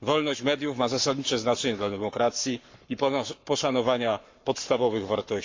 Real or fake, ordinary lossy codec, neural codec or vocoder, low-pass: fake; MP3, 64 kbps; codec, 44.1 kHz, 7.8 kbps, Pupu-Codec; 7.2 kHz